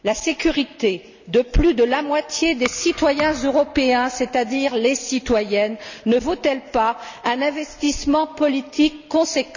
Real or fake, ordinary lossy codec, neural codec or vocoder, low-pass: real; none; none; 7.2 kHz